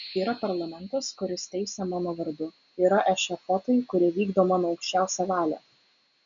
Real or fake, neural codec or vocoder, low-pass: real; none; 7.2 kHz